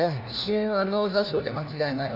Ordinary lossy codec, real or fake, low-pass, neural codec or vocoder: none; fake; 5.4 kHz; codec, 16 kHz, 1 kbps, FunCodec, trained on LibriTTS, 50 frames a second